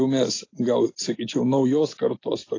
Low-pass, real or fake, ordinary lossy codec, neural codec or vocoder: 7.2 kHz; real; AAC, 32 kbps; none